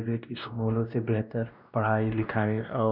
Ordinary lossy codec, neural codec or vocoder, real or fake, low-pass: none; codec, 16 kHz, 1 kbps, X-Codec, WavLM features, trained on Multilingual LibriSpeech; fake; 5.4 kHz